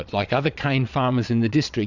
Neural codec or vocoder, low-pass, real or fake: vocoder, 22.05 kHz, 80 mel bands, Vocos; 7.2 kHz; fake